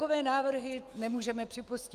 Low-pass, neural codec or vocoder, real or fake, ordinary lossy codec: 14.4 kHz; autoencoder, 48 kHz, 128 numbers a frame, DAC-VAE, trained on Japanese speech; fake; Opus, 32 kbps